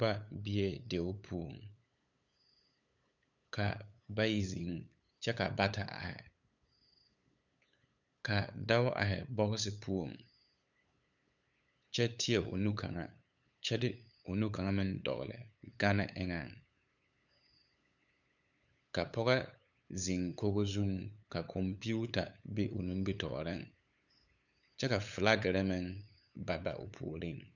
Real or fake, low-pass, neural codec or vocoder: fake; 7.2 kHz; codec, 16 kHz, 8 kbps, FunCodec, trained on LibriTTS, 25 frames a second